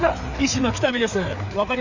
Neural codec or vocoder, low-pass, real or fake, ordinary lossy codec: codec, 16 kHz, 4 kbps, FreqCodec, larger model; 7.2 kHz; fake; none